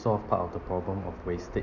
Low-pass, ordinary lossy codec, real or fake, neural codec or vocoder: 7.2 kHz; none; real; none